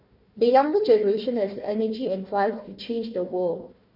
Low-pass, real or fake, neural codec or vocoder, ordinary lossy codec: 5.4 kHz; fake; codec, 16 kHz, 1 kbps, FunCodec, trained on Chinese and English, 50 frames a second; none